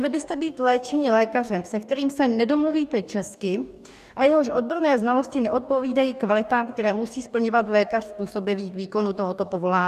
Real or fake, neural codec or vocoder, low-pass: fake; codec, 44.1 kHz, 2.6 kbps, DAC; 14.4 kHz